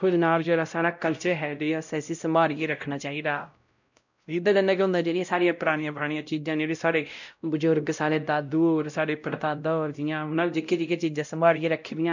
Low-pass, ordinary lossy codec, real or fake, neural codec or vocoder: 7.2 kHz; none; fake; codec, 16 kHz, 0.5 kbps, X-Codec, WavLM features, trained on Multilingual LibriSpeech